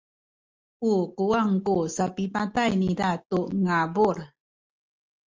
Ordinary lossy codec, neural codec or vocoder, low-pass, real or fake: Opus, 32 kbps; none; 7.2 kHz; real